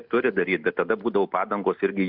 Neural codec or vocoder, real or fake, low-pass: none; real; 5.4 kHz